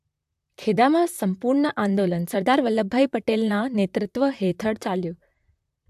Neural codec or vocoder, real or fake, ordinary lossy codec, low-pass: vocoder, 44.1 kHz, 128 mel bands, Pupu-Vocoder; fake; AAC, 96 kbps; 14.4 kHz